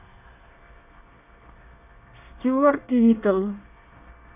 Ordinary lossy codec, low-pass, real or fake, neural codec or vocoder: none; 3.6 kHz; fake; codec, 24 kHz, 1 kbps, SNAC